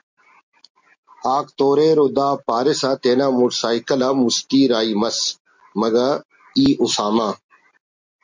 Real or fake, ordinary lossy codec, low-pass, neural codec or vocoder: real; MP3, 64 kbps; 7.2 kHz; none